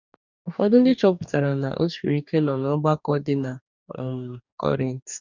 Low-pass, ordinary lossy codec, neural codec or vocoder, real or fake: 7.2 kHz; none; codec, 44.1 kHz, 2.6 kbps, DAC; fake